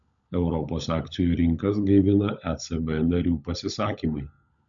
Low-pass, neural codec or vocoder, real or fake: 7.2 kHz; codec, 16 kHz, 16 kbps, FunCodec, trained on LibriTTS, 50 frames a second; fake